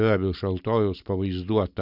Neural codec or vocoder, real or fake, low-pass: none; real; 5.4 kHz